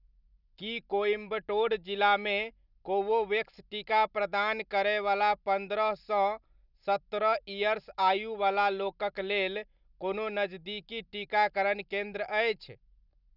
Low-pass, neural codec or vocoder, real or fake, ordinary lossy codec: 5.4 kHz; none; real; none